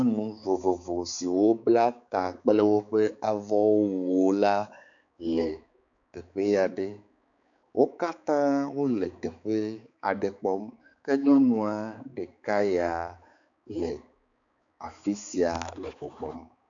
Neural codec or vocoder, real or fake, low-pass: codec, 16 kHz, 4 kbps, X-Codec, HuBERT features, trained on balanced general audio; fake; 7.2 kHz